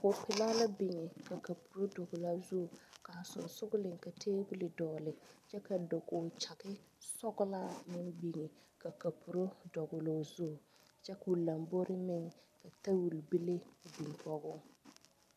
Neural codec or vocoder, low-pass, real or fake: none; 14.4 kHz; real